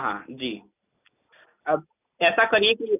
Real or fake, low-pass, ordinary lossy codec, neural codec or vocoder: real; 3.6 kHz; none; none